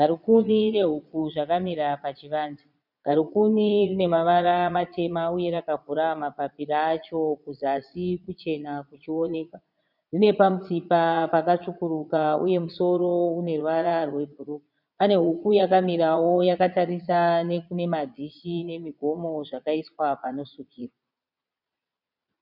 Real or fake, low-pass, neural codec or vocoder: fake; 5.4 kHz; vocoder, 22.05 kHz, 80 mel bands, Vocos